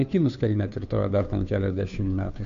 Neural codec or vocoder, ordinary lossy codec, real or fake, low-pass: codec, 16 kHz, 2 kbps, FunCodec, trained on Chinese and English, 25 frames a second; MP3, 64 kbps; fake; 7.2 kHz